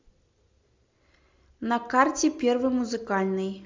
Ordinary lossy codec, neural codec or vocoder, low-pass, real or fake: MP3, 64 kbps; none; 7.2 kHz; real